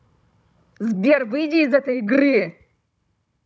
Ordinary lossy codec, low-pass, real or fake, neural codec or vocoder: none; none; fake; codec, 16 kHz, 16 kbps, FunCodec, trained on Chinese and English, 50 frames a second